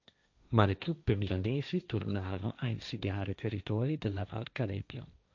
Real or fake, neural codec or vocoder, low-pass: fake; codec, 16 kHz, 1.1 kbps, Voila-Tokenizer; 7.2 kHz